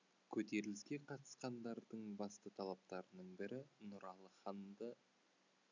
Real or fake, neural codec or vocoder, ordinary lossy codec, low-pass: real; none; none; 7.2 kHz